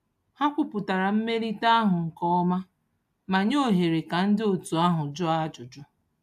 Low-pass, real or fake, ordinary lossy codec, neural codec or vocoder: 14.4 kHz; real; AAC, 96 kbps; none